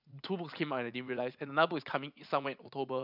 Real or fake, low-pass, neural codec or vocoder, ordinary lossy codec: fake; 5.4 kHz; vocoder, 44.1 kHz, 80 mel bands, Vocos; none